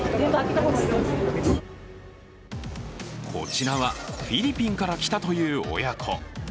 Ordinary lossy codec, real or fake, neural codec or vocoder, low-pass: none; real; none; none